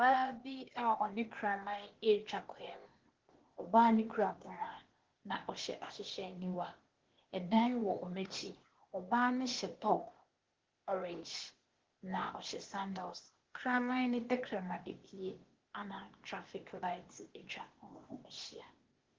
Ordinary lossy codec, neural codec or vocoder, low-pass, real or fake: Opus, 16 kbps; codec, 16 kHz, 0.8 kbps, ZipCodec; 7.2 kHz; fake